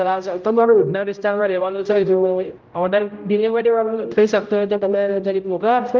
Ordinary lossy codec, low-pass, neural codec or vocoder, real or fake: Opus, 24 kbps; 7.2 kHz; codec, 16 kHz, 0.5 kbps, X-Codec, HuBERT features, trained on general audio; fake